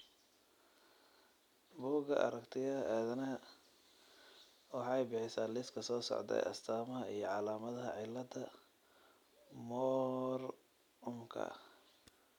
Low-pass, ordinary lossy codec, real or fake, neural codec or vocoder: 19.8 kHz; none; real; none